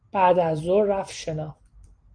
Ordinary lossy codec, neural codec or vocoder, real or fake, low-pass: Opus, 24 kbps; none; real; 9.9 kHz